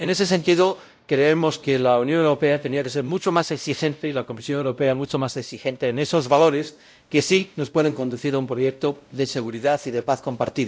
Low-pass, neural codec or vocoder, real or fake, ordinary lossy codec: none; codec, 16 kHz, 0.5 kbps, X-Codec, WavLM features, trained on Multilingual LibriSpeech; fake; none